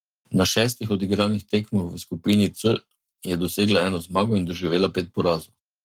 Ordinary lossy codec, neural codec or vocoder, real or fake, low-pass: Opus, 32 kbps; codec, 44.1 kHz, 7.8 kbps, Pupu-Codec; fake; 19.8 kHz